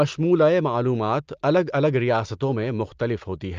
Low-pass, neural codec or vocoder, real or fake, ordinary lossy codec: 7.2 kHz; none; real; Opus, 24 kbps